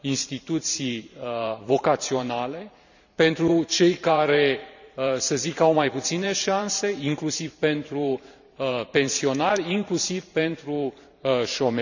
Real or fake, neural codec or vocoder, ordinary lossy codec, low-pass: fake; vocoder, 44.1 kHz, 128 mel bands every 512 samples, BigVGAN v2; none; 7.2 kHz